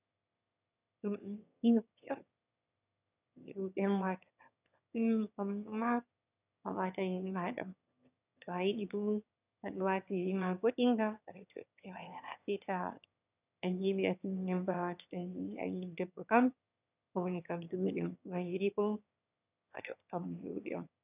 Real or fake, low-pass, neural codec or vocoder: fake; 3.6 kHz; autoencoder, 22.05 kHz, a latent of 192 numbers a frame, VITS, trained on one speaker